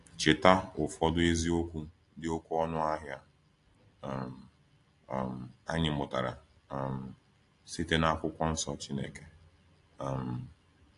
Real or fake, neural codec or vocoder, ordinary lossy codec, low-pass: real; none; AAC, 48 kbps; 10.8 kHz